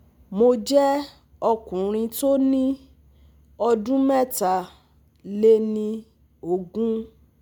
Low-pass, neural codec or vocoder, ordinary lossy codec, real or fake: none; none; none; real